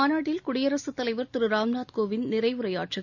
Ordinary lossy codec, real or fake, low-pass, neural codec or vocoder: none; real; 7.2 kHz; none